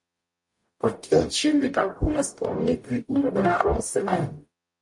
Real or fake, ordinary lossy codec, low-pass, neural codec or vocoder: fake; MP3, 48 kbps; 10.8 kHz; codec, 44.1 kHz, 0.9 kbps, DAC